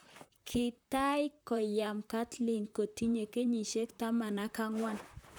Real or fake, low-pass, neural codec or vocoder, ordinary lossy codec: fake; none; vocoder, 44.1 kHz, 128 mel bands, Pupu-Vocoder; none